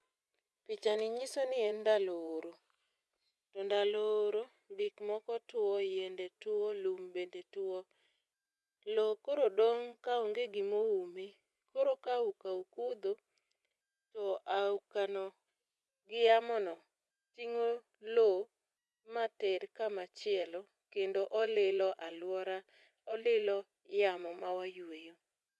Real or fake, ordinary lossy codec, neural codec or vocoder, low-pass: fake; none; vocoder, 24 kHz, 100 mel bands, Vocos; none